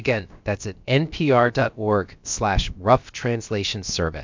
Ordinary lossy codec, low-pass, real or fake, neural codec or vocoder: MP3, 64 kbps; 7.2 kHz; fake; codec, 16 kHz, about 1 kbps, DyCAST, with the encoder's durations